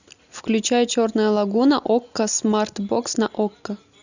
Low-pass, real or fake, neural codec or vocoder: 7.2 kHz; real; none